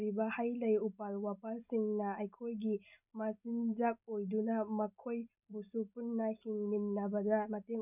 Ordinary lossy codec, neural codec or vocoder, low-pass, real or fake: none; none; 3.6 kHz; real